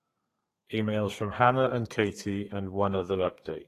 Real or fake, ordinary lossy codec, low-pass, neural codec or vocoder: fake; AAC, 48 kbps; 14.4 kHz; codec, 32 kHz, 1.9 kbps, SNAC